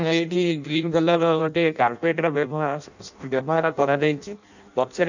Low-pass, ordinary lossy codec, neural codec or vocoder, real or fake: 7.2 kHz; none; codec, 16 kHz in and 24 kHz out, 0.6 kbps, FireRedTTS-2 codec; fake